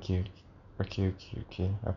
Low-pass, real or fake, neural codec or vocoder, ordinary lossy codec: 7.2 kHz; real; none; none